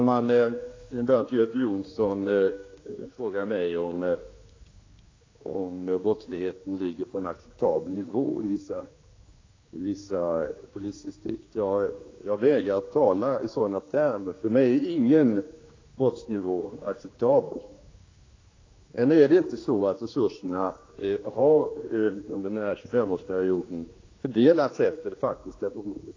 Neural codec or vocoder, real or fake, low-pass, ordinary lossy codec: codec, 16 kHz, 2 kbps, X-Codec, HuBERT features, trained on general audio; fake; 7.2 kHz; AAC, 32 kbps